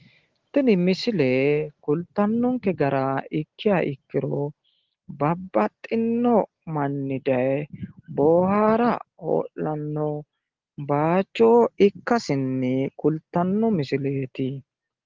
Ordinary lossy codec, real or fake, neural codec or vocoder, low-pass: Opus, 16 kbps; real; none; 7.2 kHz